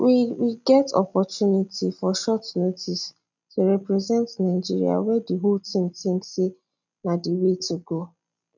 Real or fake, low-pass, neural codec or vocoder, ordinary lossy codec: real; 7.2 kHz; none; none